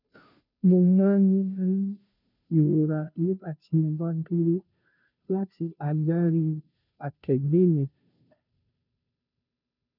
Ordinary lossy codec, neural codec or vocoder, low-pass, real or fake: none; codec, 16 kHz, 0.5 kbps, FunCodec, trained on Chinese and English, 25 frames a second; 5.4 kHz; fake